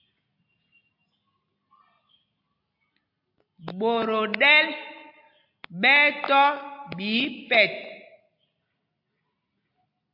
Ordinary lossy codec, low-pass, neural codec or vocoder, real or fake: MP3, 48 kbps; 5.4 kHz; none; real